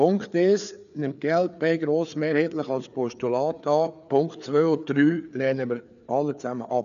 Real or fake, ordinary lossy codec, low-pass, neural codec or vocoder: fake; none; 7.2 kHz; codec, 16 kHz, 4 kbps, FreqCodec, larger model